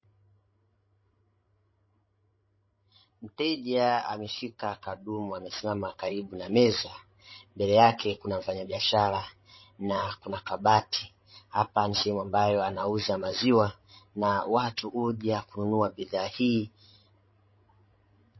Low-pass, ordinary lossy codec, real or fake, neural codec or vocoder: 7.2 kHz; MP3, 24 kbps; fake; codec, 16 kHz, 16 kbps, FreqCodec, larger model